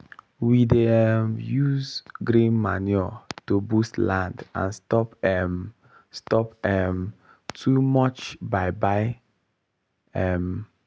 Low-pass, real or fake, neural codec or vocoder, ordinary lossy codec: none; real; none; none